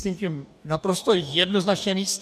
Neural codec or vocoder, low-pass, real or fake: codec, 44.1 kHz, 2.6 kbps, DAC; 14.4 kHz; fake